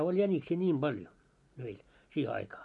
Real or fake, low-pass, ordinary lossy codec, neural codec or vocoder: real; 10.8 kHz; MP3, 48 kbps; none